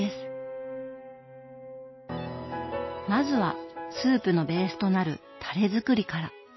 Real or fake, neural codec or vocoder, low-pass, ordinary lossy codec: real; none; 7.2 kHz; MP3, 24 kbps